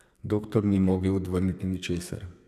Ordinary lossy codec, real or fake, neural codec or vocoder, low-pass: none; fake; codec, 32 kHz, 1.9 kbps, SNAC; 14.4 kHz